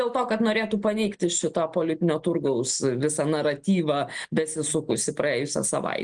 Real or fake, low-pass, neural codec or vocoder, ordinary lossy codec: real; 9.9 kHz; none; Opus, 24 kbps